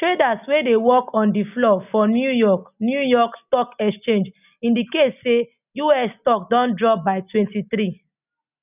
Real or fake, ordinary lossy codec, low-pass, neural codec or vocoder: real; none; 3.6 kHz; none